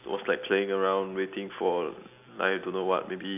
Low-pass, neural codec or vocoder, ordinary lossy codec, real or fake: 3.6 kHz; none; none; real